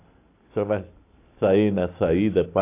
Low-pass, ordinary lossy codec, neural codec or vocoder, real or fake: 3.6 kHz; AAC, 24 kbps; none; real